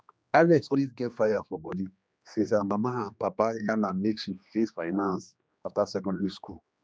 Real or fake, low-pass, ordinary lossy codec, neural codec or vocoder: fake; none; none; codec, 16 kHz, 2 kbps, X-Codec, HuBERT features, trained on general audio